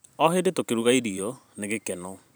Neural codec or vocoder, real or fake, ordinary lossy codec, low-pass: vocoder, 44.1 kHz, 128 mel bands every 512 samples, BigVGAN v2; fake; none; none